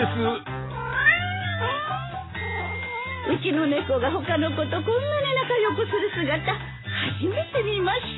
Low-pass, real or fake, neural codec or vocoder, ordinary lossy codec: 7.2 kHz; real; none; AAC, 16 kbps